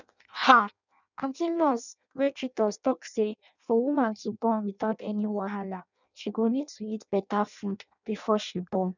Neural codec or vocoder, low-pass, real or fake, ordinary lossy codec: codec, 16 kHz in and 24 kHz out, 0.6 kbps, FireRedTTS-2 codec; 7.2 kHz; fake; none